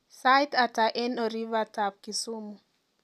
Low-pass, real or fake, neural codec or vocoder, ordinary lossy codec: 14.4 kHz; real; none; none